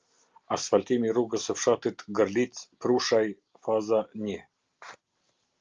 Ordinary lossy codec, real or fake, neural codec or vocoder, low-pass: Opus, 24 kbps; real; none; 7.2 kHz